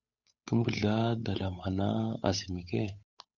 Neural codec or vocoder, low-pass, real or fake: codec, 16 kHz, 8 kbps, FunCodec, trained on Chinese and English, 25 frames a second; 7.2 kHz; fake